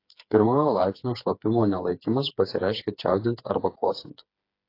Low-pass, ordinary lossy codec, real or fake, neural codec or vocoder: 5.4 kHz; AAC, 32 kbps; fake; codec, 16 kHz, 4 kbps, FreqCodec, smaller model